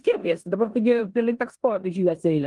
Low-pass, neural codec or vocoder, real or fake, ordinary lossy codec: 10.8 kHz; codec, 16 kHz in and 24 kHz out, 0.9 kbps, LongCat-Audio-Codec, four codebook decoder; fake; Opus, 24 kbps